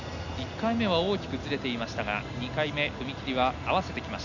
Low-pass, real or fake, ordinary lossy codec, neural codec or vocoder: 7.2 kHz; real; none; none